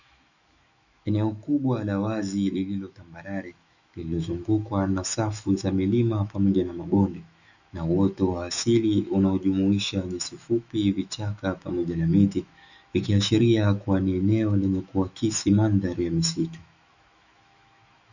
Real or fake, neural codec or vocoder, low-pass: fake; autoencoder, 48 kHz, 128 numbers a frame, DAC-VAE, trained on Japanese speech; 7.2 kHz